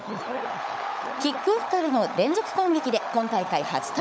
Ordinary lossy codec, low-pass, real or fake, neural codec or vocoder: none; none; fake; codec, 16 kHz, 16 kbps, FunCodec, trained on LibriTTS, 50 frames a second